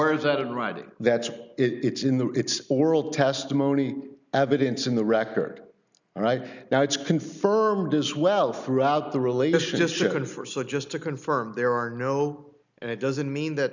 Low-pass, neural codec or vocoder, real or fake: 7.2 kHz; none; real